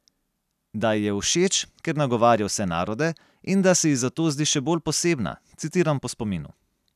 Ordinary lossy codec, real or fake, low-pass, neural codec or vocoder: none; real; 14.4 kHz; none